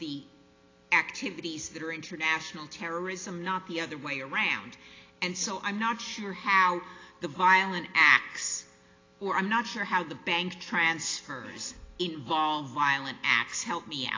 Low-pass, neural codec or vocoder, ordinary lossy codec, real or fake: 7.2 kHz; none; AAC, 32 kbps; real